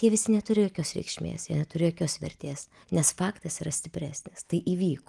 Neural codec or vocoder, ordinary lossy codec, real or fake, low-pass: none; Opus, 24 kbps; real; 10.8 kHz